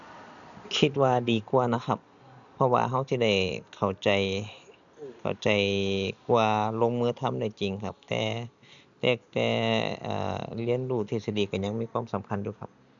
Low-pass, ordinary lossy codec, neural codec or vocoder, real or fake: 7.2 kHz; none; none; real